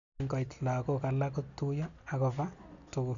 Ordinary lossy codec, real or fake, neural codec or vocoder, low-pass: none; real; none; 7.2 kHz